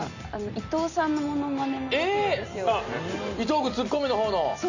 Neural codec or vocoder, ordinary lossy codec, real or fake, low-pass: none; Opus, 64 kbps; real; 7.2 kHz